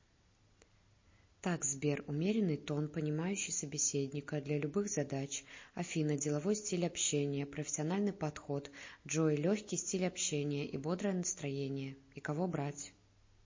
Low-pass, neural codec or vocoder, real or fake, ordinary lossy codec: 7.2 kHz; none; real; MP3, 32 kbps